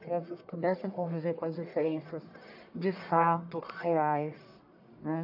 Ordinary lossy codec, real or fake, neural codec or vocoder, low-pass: AAC, 48 kbps; fake; codec, 44.1 kHz, 1.7 kbps, Pupu-Codec; 5.4 kHz